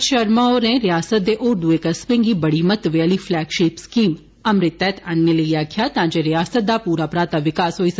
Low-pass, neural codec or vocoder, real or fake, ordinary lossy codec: none; none; real; none